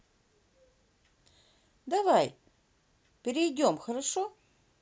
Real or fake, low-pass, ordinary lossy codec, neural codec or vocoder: real; none; none; none